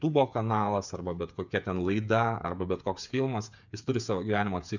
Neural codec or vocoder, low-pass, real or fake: codec, 16 kHz, 8 kbps, FreqCodec, smaller model; 7.2 kHz; fake